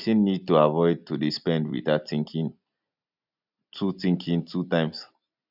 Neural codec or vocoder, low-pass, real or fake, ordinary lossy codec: none; 5.4 kHz; real; none